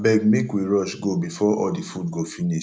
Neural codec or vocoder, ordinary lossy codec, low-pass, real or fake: none; none; none; real